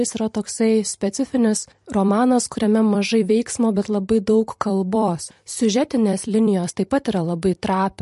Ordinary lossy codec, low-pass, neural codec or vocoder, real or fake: MP3, 48 kbps; 14.4 kHz; vocoder, 44.1 kHz, 128 mel bands every 256 samples, BigVGAN v2; fake